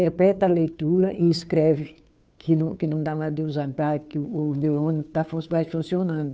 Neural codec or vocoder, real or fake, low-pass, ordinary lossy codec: codec, 16 kHz, 2 kbps, FunCodec, trained on Chinese and English, 25 frames a second; fake; none; none